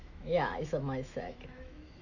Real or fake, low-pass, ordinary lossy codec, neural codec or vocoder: fake; 7.2 kHz; none; autoencoder, 48 kHz, 128 numbers a frame, DAC-VAE, trained on Japanese speech